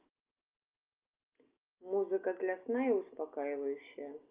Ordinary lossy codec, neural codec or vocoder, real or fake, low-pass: Opus, 24 kbps; none; real; 3.6 kHz